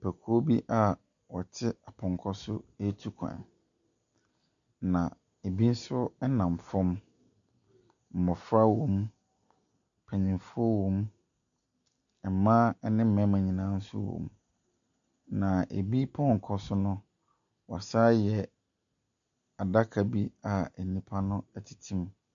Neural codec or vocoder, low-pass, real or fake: none; 7.2 kHz; real